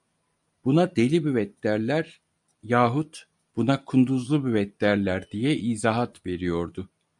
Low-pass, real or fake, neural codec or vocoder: 10.8 kHz; real; none